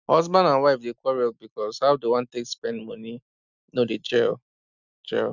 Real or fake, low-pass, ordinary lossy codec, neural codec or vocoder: real; 7.2 kHz; none; none